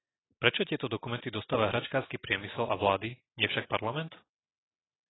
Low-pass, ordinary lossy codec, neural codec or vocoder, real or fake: 7.2 kHz; AAC, 16 kbps; none; real